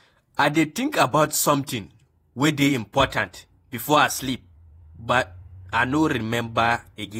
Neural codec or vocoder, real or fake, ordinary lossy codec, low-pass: vocoder, 48 kHz, 128 mel bands, Vocos; fake; AAC, 48 kbps; 19.8 kHz